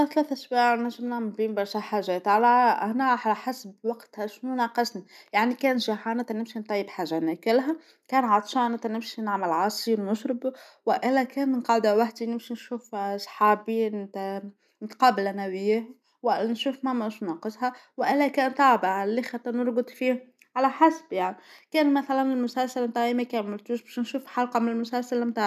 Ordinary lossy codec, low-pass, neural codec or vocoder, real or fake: none; 14.4 kHz; none; real